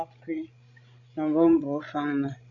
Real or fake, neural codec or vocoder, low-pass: fake; codec, 16 kHz, 16 kbps, FreqCodec, larger model; 7.2 kHz